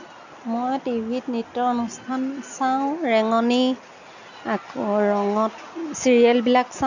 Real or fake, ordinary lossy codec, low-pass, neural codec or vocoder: real; none; 7.2 kHz; none